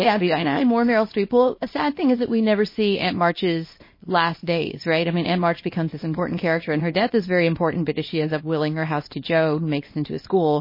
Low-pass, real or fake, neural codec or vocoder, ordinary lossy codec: 5.4 kHz; fake; codec, 24 kHz, 0.9 kbps, WavTokenizer, small release; MP3, 24 kbps